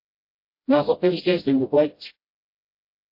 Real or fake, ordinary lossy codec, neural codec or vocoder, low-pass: fake; MP3, 32 kbps; codec, 16 kHz, 0.5 kbps, FreqCodec, smaller model; 5.4 kHz